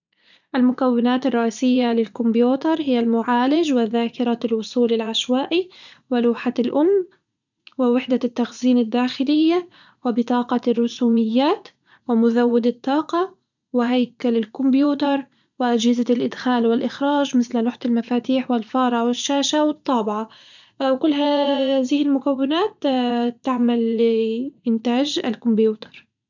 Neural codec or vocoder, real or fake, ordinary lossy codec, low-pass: vocoder, 44.1 kHz, 80 mel bands, Vocos; fake; none; 7.2 kHz